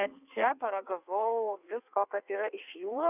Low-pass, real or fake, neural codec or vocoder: 3.6 kHz; fake; codec, 16 kHz in and 24 kHz out, 1.1 kbps, FireRedTTS-2 codec